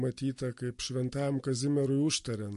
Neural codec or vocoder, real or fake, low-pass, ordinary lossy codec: none; real; 14.4 kHz; MP3, 48 kbps